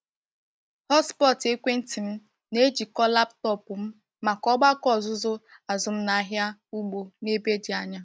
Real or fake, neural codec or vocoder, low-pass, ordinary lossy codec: real; none; none; none